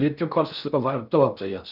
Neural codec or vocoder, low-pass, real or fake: codec, 16 kHz in and 24 kHz out, 0.6 kbps, FocalCodec, streaming, 4096 codes; 5.4 kHz; fake